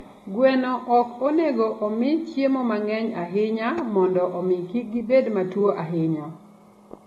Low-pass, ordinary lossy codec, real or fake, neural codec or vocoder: 19.8 kHz; AAC, 32 kbps; real; none